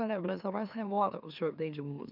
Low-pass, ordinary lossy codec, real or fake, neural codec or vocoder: 5.4 kHz; none; fake; autoencoder, 44.1 kHz, a latent of 192 numbers a frame, MeloTTS